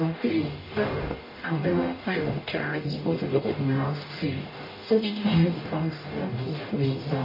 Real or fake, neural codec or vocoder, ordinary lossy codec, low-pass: fake; codec, 44.1 kHz, 0.9 kbps, DAC; MP3, 24 kbps; 5.4 kHz